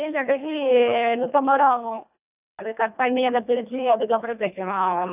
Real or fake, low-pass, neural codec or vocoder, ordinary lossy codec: fake; 3.6 kHz; codec, 24 kHz, 1.5 kbps, HILCodec; none